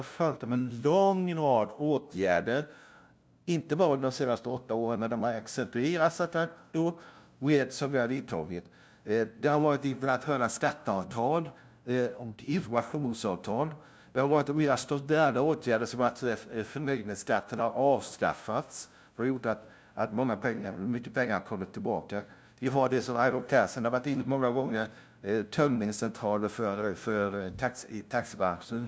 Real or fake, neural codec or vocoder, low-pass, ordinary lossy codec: fake; codec, 16 kHz, 0.5 kbps, FunCodec, trained on LibriTTS, 25 frames a second; none; none